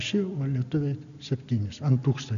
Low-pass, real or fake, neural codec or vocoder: 7.2 kHz; real; none